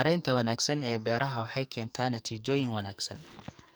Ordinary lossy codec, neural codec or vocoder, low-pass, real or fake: none; codec, 44.1 kHz, 2.6 kbps, SNAC; none; fake